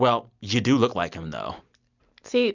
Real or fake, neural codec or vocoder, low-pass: real; none; 7.2 kHz